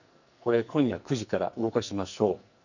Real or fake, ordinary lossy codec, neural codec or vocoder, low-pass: fake; none; codec, 44.1 kHz, 2.6 kbps, SNAC; 7.2 kHz